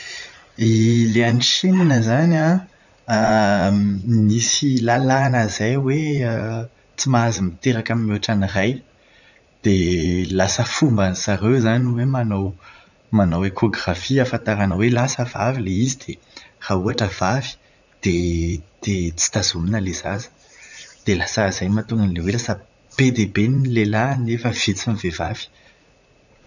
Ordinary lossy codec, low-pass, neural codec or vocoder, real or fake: none; 7.2 kHz; vocoder, 44.1 kHz, 80 mel bands, Vocos; fake